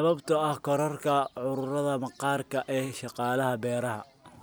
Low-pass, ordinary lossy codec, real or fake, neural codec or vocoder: none; none; real; none